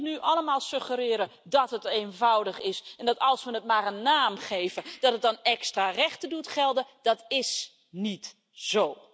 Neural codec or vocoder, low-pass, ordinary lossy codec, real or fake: none; none; none; real